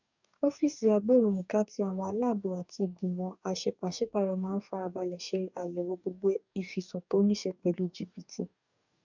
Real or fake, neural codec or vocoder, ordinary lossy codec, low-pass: fake; codec, 44.1 kHz, 2.6 kbps, DAC; none; 7.2 kHz